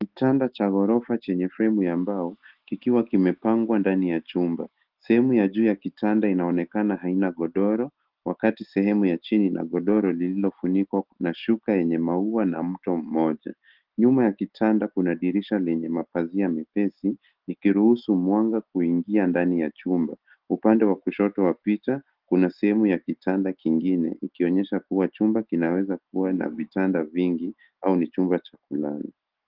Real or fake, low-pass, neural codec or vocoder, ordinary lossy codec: real; 5.4 kHz; none; Opus, 16 kbps